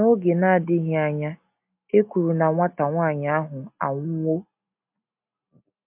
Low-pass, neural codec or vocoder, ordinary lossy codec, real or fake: 3.6 kHz; none; MP3, 32 kbps; real